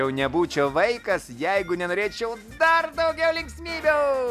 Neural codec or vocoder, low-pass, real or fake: none; 14.4 kHz; real